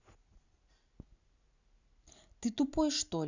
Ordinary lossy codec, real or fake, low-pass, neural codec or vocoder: none; real; 7.2 kHz; none